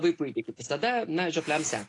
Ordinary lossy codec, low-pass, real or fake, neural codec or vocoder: AAC, 48 kbps; 10.8 kHz; real; none